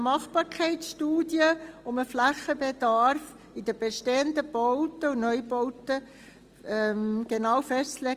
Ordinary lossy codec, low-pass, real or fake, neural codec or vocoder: Opus, 24 kbps; 14.4 kHz; real; none